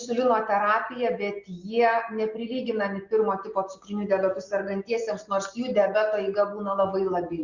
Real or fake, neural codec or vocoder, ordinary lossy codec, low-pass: real; none; Opus, 64 kbps; 7.2 kHz